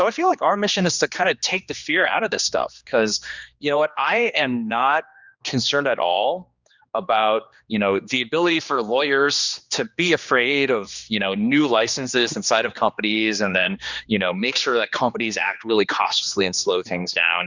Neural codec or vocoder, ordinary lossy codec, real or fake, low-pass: codec, 16 kHz, 2 kbps, X-Codec, HuBERT features, trained on general audio; Opus, 64 kbps; fake; 7.2 kHz